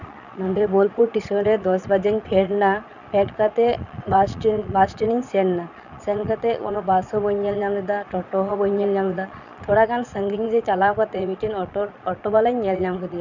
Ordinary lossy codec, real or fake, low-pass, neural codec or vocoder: none; fake; 7.2 kHz; vocoder, 22.05 kHz, 80 mel bands, Vocos